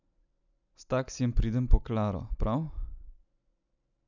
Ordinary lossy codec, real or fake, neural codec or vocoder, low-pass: none; real; none; 7.2 kHz